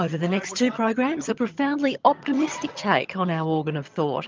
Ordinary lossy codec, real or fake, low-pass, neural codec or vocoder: Opus, 24 kbps; fake; 7.2 kHz; vocoder, 22.05 kHz, 80 mel bands, HiFi-GAN